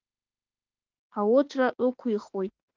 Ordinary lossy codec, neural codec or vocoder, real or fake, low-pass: Opus, 24 kbps; autoencoder, 48 kHz, 32 numbers a frame, DAC-VAE, trained on Japanese speech; fake; 7.2 kHz